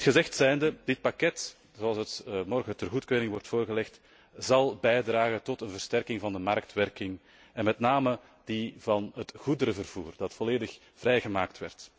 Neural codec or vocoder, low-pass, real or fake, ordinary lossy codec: none; none; real; none